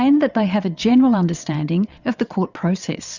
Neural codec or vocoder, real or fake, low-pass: vocoder, 22.05 kHz, 80 mel bands, WaveNeXt; fake; 7.2 kHz